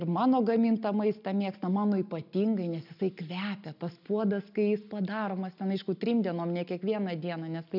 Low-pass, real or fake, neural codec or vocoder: 5.4 kHz; real; none